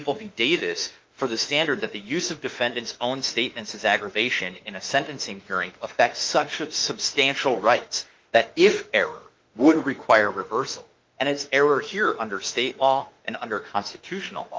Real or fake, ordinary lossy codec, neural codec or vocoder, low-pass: fake; Opus, 24 kbps; autoencoder, 48 kHz, 32 numbers a frame, DAC-VAE, trained on Japanese speech; 7.2 kHz